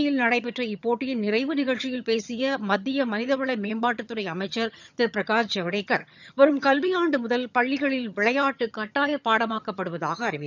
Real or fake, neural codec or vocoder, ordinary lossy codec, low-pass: fake; vocoder, 22.05 kHz, 80 mel bands, HiFi-GAN; none; 7.2 kHz